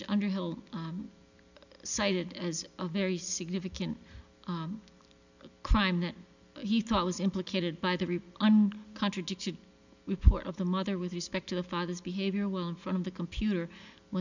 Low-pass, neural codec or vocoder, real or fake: 7.2 kHz; none; real